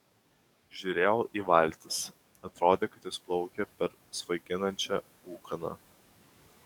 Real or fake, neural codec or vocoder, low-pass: fake; codec, 44.1 kHz, 7.8 kbps, DAC; 19.8 kHz